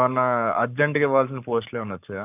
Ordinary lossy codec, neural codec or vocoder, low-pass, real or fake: none; codec, 44.1 kHz, 7.8 kbps, Pupu-Codec; 3.6 kHz; fake